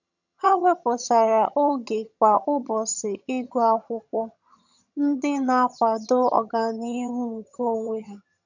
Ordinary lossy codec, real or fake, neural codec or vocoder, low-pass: none; fake; vocoder, 22.05 kHz, 80 mel bands, HiFi-GAN; 7.2 kHz